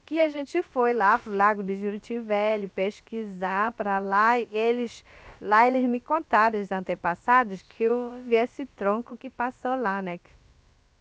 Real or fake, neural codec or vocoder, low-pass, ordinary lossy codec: fake; codec, 16 kHz, about 1 kbps, DyCAST, with the encoder's durations; none; none